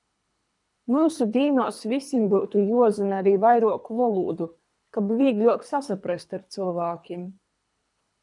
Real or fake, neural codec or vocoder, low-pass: fake; codec, 24 kHz, 3 kbps, HILCodec; 10.8 kHz